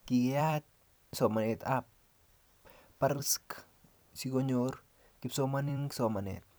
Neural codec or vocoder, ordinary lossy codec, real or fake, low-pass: vocoder, 44.1 kHz, 128 mel bands every 256 samples, BigVGAN v2; none; fake; none